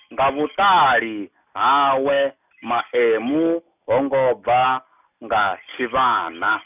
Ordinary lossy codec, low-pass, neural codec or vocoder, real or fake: none; 3.6 kHz; none; real